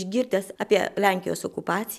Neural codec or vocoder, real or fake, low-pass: none; real; 14.4 kHz